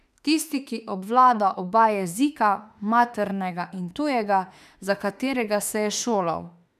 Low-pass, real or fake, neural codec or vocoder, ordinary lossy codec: 14.4 kHz; fake; autoencoder, 48 kHz, 32 numbers a frame, DAC-VAE, trained on Japanese speech; none